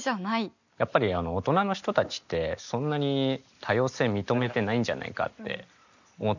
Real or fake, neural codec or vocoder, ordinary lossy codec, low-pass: real; none; none; 7.2 kHz